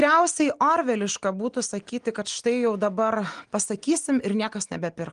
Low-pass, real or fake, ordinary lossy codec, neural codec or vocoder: 9.9 kHz; real; Opus, 24 kbps; none